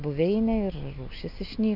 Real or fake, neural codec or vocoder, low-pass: real; none; 5.4 kHz